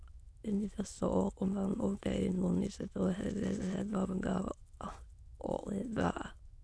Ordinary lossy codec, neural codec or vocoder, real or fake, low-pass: none; autoencoder, 22.05 kHz, a latent of 192 numbers a frame, VITS, trained on many speakers; fake; none